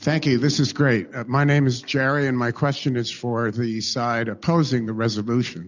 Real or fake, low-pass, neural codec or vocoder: real; 7.2 kHz; none